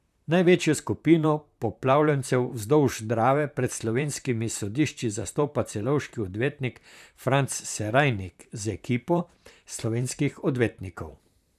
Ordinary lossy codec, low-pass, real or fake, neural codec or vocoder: none; 14.4 kHz; fake; vocoder, 44.1 kHz, 128 mel bands, Pupu-Vocoder